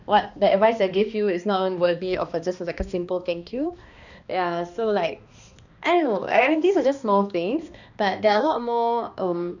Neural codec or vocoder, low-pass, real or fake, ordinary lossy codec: codec, 16 kHz, 2 kbps, X-Codec, HuBERT features, trained on balanced general audio; 7.2 kHz; fake; none